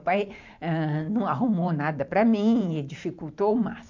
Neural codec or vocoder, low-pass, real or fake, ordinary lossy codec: vocoder, 44.1 kHz, 128 mel bands every 512 samples, BigVGAN v2; 7.2 kHz; fake; MP3, 48 kbps